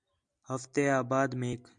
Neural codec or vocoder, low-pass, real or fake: none; 9.9 kHz; real